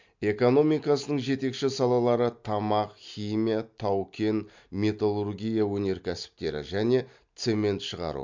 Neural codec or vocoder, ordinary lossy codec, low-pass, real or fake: none; MP3, 64 kbps; 7.2 kHz; real